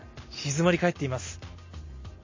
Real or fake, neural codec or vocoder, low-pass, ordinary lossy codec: real; none; 7.2 kHz; MP3, 32 kbps